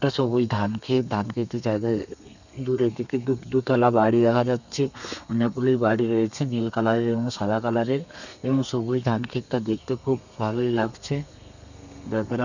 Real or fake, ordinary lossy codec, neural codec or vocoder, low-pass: fake; none; codec, 32 kHz, 1.9 kbps, SNAC; 7.2 kHz